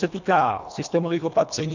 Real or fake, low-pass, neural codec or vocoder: fake; 7.2 kHz; codec, 24 kHz, 1.5 kbps, HILCodec